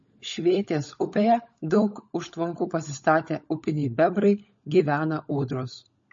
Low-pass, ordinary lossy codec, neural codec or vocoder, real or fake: 7.2 kHz; MP3, 32 kbps; codec, 16 kHz, 16 kbps, FunCodec, trained on LibriTTS, 50 frames a second; fake